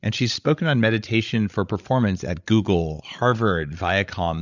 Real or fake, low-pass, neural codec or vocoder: fake; 7.2 kHz; codec, 16 kHz, 16 kbps, FreqCodec, larger model